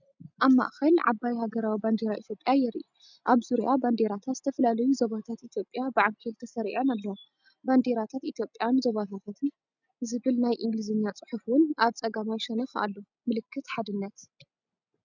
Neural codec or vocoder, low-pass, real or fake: none; 7.2 kHz; real